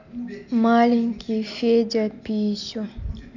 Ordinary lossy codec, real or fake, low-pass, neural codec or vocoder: none; real; 7.2 kHz; none